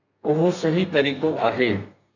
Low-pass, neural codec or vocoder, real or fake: 7.2 kHz; codec, 32 kHz, 1.9 kbps, SNAC; fake